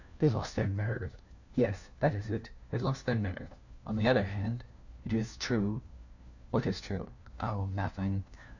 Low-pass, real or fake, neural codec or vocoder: 7.2 kHz; fake; codec, 16 kHz, 1 kbps, FunCodec, trained on LibriTTS, 50 frames a second